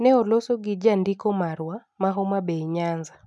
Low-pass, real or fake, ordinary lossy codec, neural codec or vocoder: none; real; none; none